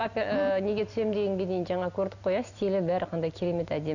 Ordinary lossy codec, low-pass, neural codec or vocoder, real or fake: none; 7.2 kHz; none; real